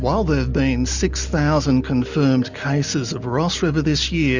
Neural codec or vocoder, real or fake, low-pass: none; real; 7.2 kHz